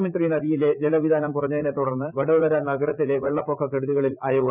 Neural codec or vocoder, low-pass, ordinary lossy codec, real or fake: vocoder, 44.1 kHz, 80 mel bands, Vocos; 3.6 kHz; none; fake